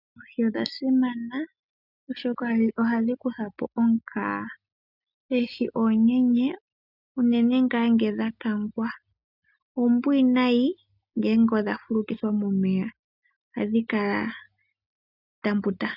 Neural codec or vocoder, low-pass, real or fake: none; 5.4 kHz; real